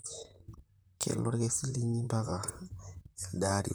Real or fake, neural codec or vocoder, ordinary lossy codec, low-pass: fake; vocoder, 44.1 kHz, 128 mel bands, Pupu-Vocoder; none; none